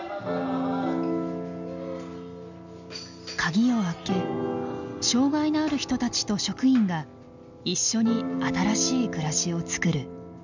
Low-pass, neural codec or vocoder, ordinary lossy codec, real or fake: 7.2 kHz; none; none; real